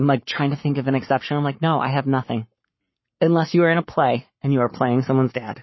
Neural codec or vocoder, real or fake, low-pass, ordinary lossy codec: codec, 44.1 kHz, 7.8 kbps, Pupu-Codec; fake; 7.2 kHz; MP3, 24 kbps